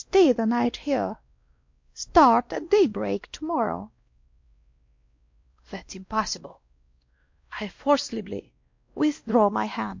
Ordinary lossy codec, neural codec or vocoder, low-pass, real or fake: MP3, 48 kbps; codec, 16 kHz, 1 kbps, X-Codec, WavLM features, trained on Multilingual LibriSpeech; 7.2 kHz; fake